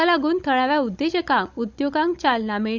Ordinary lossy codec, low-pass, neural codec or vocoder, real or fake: none; 7.2 kHz; codec, 16 kHz, 16 kbps, FunCodec, trained on Chinese and English, 50 frames a second; fake